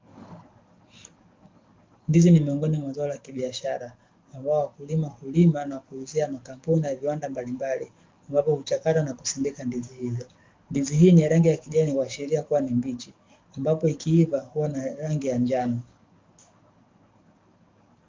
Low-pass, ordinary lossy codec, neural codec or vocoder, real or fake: 7.2 kHz; Opus, 24 kbps; codec, 44.1 kHz, 7.8 kbps, DAC; fake